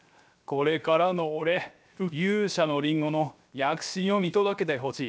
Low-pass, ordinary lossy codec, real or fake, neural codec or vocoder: none; none; fake; codec, 16 kHz, 0.7 kbps, FocalCodec